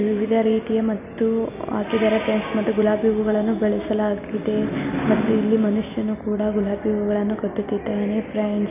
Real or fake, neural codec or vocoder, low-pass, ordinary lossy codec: real; none; 3.6 kHz; none